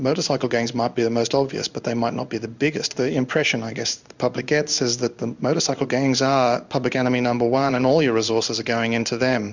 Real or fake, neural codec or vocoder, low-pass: fake; codec, 16 kHz in and 24 kHz out, 1 kbps, XY-Tokenizer; 7.2 kHz